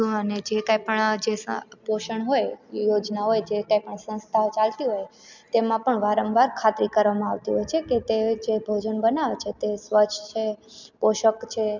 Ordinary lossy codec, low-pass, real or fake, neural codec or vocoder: none; 7.2 kHz; real; none